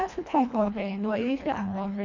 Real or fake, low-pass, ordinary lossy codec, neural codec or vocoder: fake; 7.2 kHz; none; codec, 24 kHz, 1.5 kbps, HILCodec